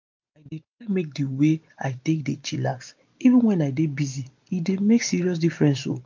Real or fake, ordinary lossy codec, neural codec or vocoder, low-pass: real; MP3, 48 kbps; none; 7.2 kHz